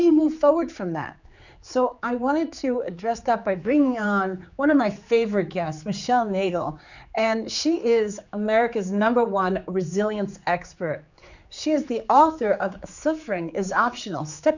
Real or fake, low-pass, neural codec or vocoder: fake; 7.2 kHz; codec, 16 kHz, 4 kbps, X-Codec, HuBERT features, trained on general audio